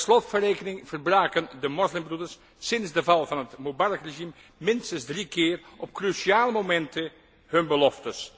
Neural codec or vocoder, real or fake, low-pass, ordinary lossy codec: none; real; none; none